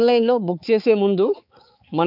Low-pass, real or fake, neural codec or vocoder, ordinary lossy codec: 5.4 kHz; fake; codec, 16 kHz, 4 kbps, X-Codec, HuBERT features, trained on balanced general audio; none